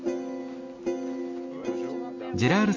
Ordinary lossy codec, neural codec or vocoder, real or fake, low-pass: MP3, 48 kbps; none; real; 7.2 kHz